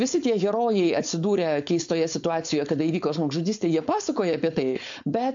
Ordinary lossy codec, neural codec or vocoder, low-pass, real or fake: MP3, 48 kbps; codec, 16 kHz, 4.8 kbps, FACodec; 7.2 kHz; fake